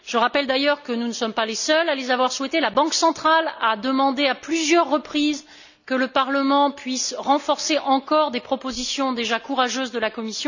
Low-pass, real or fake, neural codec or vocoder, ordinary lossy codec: 7.2 kHz; real; none; none